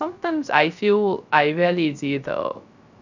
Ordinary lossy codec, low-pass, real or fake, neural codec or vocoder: none; 7.2 kHz; fake; codec, 16 kHz, 0.3 kbps, FocalCodec